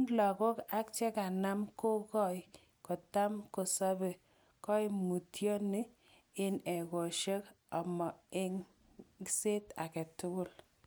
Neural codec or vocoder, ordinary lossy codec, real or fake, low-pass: none; none; real; none